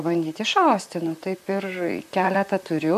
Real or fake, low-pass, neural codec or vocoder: fake; 14.4 kHz; vocoder, 44.1 kHz, 128 mel bands, Pupu-Vocoder